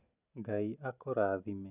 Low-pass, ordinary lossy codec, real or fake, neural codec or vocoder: 3.6 kHz; none; real; none